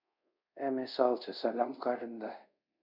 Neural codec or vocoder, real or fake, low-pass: codec, 24 kHz, 0.5 kbps, DualCodec; fake; 5.4 kHz